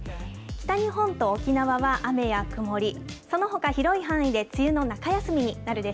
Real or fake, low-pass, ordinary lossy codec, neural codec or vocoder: real; none; none; none